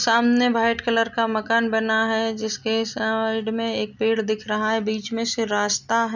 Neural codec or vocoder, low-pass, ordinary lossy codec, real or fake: none; 7.2 kHz; none; real